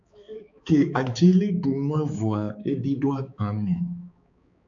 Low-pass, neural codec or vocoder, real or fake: 7.2 kHz; codec, 16 kHz, 4 kbps, X-Codec, HuBERT features, trained on balanced general audio; fake